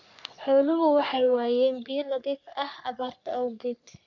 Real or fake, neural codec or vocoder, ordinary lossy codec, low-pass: fake; codec, 44.1 kHz, 3.4 kbps, Pupu-Codec; none; 7.2 kHz